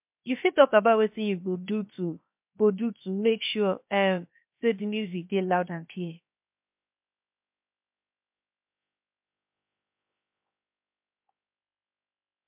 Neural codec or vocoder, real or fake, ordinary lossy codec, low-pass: codec, 16 kHz, 0.7 kbps, FocalCodec; fake; MP3, 32 kbps; 3.6 kHz